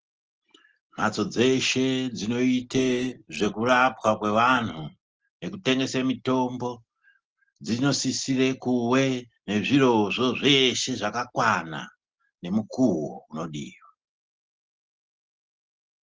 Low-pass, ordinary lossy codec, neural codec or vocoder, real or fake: 7.2 kHz; Opus, 16 kbps; none; real